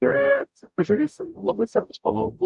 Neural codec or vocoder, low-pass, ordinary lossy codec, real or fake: codec, 44.1 kHz, 0.9 kbps, DAC; 10.8 kHz; MP3, 96 kbps; fake